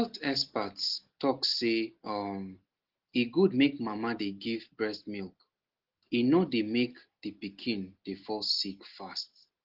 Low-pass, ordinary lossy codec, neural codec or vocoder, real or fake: 5.4 kHz; Opus, 16 kbps; none; real